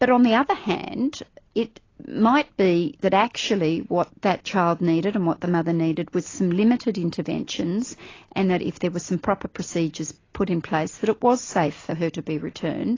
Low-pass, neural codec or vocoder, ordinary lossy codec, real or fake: 7.2 kHz; none; AAC, 32 kbps; real